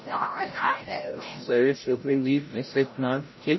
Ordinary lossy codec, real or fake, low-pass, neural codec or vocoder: MP3, 24 kbps; fake; 7.2 kHz; codec, 16 kHz, 0.5 kbps, FreqCodec, larger model